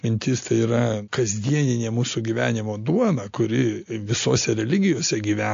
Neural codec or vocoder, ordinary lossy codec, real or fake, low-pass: none; AAC, 48 kbps; real; 7.2 kHz